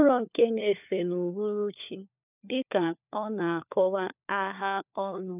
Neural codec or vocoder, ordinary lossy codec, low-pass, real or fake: codec, 16 kHz, 4 kbps, FunCodec, trained on LibriTTS, 50 frames a second; none; 3.6 kHz; fake